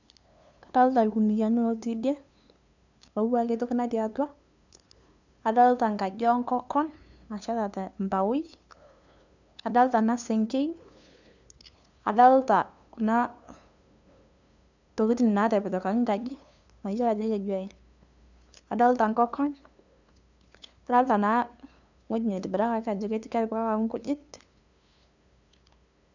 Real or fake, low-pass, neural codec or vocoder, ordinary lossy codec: fake; 7.2 kHz; codec, 16 kHz, 2 kbps, FunCodec, trained on LibriTTS, 25 frames a second; none